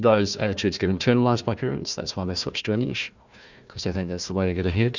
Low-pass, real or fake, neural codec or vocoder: 7.2 kHz; fake; codec, 16 kHz, 1 kbps, FunCodec, trained on Chinese and English, 50 frames a second